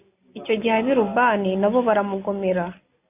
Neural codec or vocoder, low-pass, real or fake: none; 3.6 kHz; real